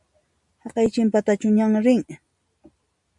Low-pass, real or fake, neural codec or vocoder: 10.8 kHz; real; none